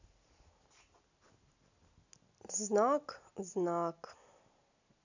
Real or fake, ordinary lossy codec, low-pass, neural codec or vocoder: real; none; 7.2 kHz; none